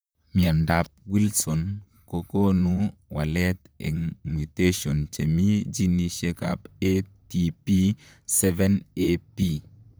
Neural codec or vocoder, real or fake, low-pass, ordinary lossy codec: vocoder, 44.1 kHz, 128 mel bands, Pupu-Vocoder; fake; none; none